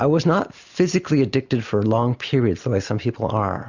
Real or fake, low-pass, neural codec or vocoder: real; 7.2 kHz; none